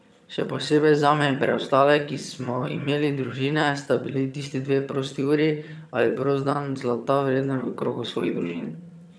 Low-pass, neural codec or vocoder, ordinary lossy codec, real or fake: none; vocoder, 22.05 kHz, 80 mel bands, HiFi-GAN; none; fake